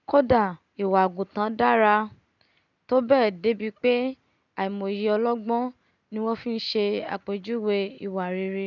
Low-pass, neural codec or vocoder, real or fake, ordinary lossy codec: 7.2 kHz; none; real; none